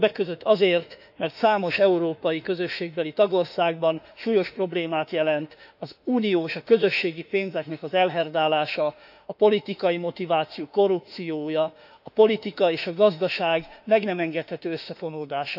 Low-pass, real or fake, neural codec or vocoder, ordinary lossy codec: 5.4 kHz; fake; autoencoder, 48 kHz, 32 numbers a frame, DAC-VAE, trained on Japanese speech; none